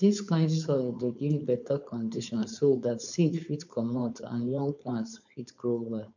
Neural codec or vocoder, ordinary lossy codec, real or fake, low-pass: codec, 16 kHz, 4.8 kbps, FACodec; none; fake; 7.2 kHz